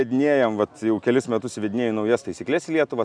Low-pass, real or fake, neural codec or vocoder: 9.9 kHz; real; none